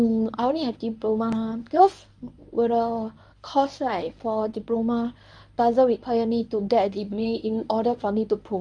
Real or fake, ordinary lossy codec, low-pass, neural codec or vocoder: fake; MP3, 96 kbps; 9.9 kHz; codec, 24 kHz, 0.9 kbps, WavTokenizer, medium speech release version 1